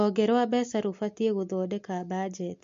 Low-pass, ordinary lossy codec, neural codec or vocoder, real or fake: 7.2 kHz; MP3, 48 kbps; none; real